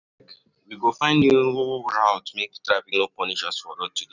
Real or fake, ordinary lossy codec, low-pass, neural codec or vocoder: real; none; 7.2 kHz; none